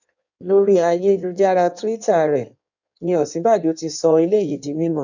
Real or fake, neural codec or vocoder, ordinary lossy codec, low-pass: fake; codec, 16 kHz in and 24 kHz out, 1.1 kbps, FireRedTTS-2 codec; none; 7.2 kHz